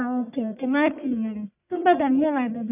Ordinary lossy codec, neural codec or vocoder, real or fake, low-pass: none; codec, 44.1 kHz, 1.7 kbps, Pupu-Codec; fake; 3.6 kHz